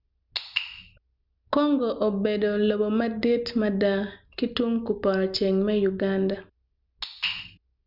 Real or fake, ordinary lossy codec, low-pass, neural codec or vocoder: real; none; 5.4 kHz; none